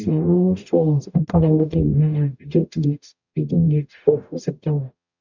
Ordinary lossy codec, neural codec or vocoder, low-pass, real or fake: none; codec, 44.1 kHz, 0.9 kbps, DAC; 7.2 kHz; fake